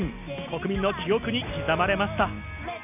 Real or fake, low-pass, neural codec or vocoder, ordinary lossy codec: real; 3.6 kHz; none; none